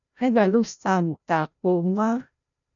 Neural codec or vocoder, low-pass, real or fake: codec, 16 kHz, 0.5 kbps, FreqCodec, larger model; 7.2 kHz; fake